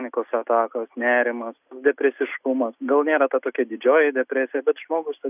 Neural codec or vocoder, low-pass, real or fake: none; 3.6 kHz; real